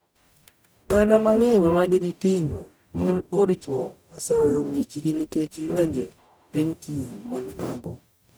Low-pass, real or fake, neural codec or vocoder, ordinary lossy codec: none; fake; codec, 44.1 kHz, 0.9 kbps, DAC; none